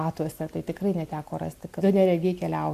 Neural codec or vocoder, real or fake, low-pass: none; real; 14.4 kHz